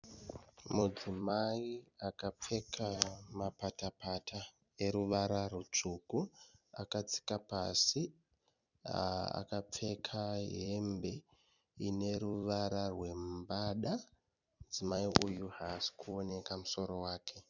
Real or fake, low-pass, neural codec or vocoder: fake; 7.2 kHz; vocoder, 44.1 kHz, 128 mel bands every 256 samples, BigVGAN v2